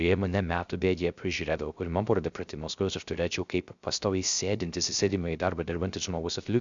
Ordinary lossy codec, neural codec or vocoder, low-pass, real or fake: Opus, 64 kbps; codec, 16 kHz, 0.3 kbps, FocalCodec; 7.2 kHz; fake